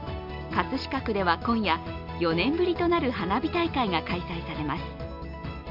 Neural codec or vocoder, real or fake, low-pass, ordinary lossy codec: none; real; 5.4 kHz; none